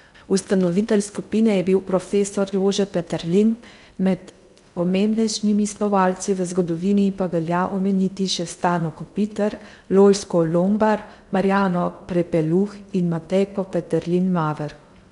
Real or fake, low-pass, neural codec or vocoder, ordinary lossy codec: fake; 10.8 kHz; codec, 16 kHz in and 24 kHz out, 0.6 kbps, FocalCodec, streaming, 2048 codes; MP3, 96 kbps